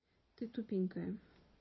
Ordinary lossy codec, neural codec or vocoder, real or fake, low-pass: MP3, 24 kbps; vocoder, 22.05 kHz, 80 mel bands, Vocos; fake; 7.2 kHz